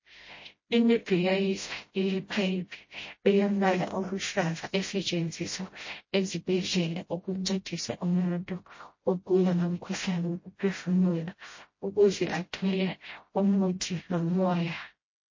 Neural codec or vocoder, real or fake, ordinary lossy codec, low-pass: codec, 16 kHz, 0.5 kbps, FreqCodec, smaller model; fake; MP3, 32 kbps; 7.2 kHz